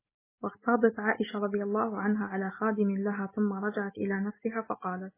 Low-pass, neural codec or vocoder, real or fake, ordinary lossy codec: 3.6 kHz; none; real; MP3, 16 kbps